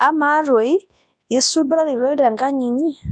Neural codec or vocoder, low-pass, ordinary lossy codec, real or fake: codec, 24 kHz, 0.9 kbps, WavTokenizer, large speech release; 9.9 kHz; AAC, 64 kbps; fake